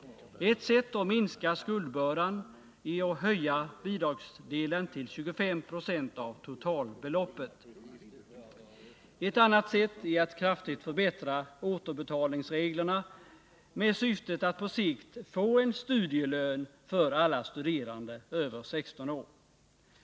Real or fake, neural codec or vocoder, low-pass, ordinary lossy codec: real; none; none; none